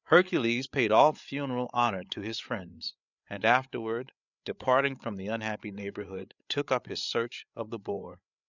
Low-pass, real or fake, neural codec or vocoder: 7.2 kHz; fake; codec, 16 kHz, 8 kbps, FreqCodec, larger model